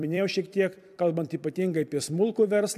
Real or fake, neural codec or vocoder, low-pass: fake; vocoder, 44.1 kHz, 128 mel bands every 256 samples, BigVGAN v2; 14.4 kHz